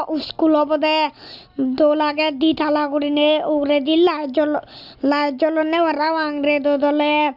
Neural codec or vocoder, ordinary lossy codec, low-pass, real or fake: none; none; 5.4 kHz; real